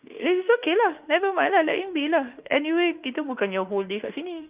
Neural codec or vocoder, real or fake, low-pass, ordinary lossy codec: autoencoder, 48 kHz, 32 numbers a frame, DAC-VAE, trained on Japanese speech; fake; 3.6 kHz; Opus, 32 kbps